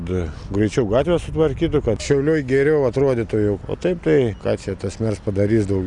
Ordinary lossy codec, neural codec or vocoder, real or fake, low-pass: Opus, 64 kbps; none; real; 10.8 kHz